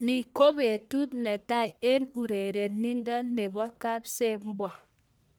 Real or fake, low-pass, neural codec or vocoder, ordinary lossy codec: fake; none; codec, 44.1 kHz, 1.7 kbps, Pupu-Codec; none